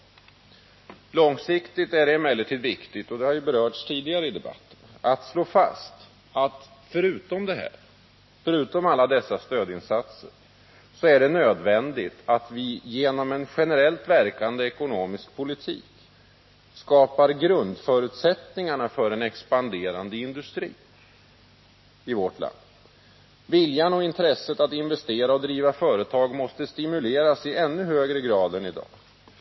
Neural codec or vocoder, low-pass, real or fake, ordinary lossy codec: none; 7.2 kHz; real; MP3, 24 kbps